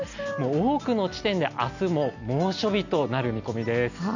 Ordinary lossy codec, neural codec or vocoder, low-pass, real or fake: none; none; 7.2 kHz; real